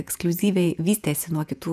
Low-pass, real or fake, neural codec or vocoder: 14.4 kHz; fake; vocoder, 48 kHz, 128 mel bands, Vocos